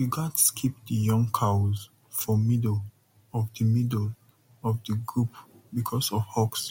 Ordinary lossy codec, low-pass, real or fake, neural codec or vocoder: MP3, 64 kbps; 19.8 kHz; real; none